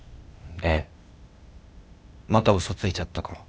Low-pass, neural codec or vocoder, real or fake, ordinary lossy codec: none; codec, 16 kHz, 0.8 kbps, ZipCodec; fake; none